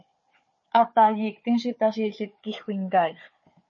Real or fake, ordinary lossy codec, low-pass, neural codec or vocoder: fake; MP3, 32 kbps; 7.2 kHz; codec, 16 kHz, 4 kbps, FunCodec, trained on Chinese and English, 50 frames a second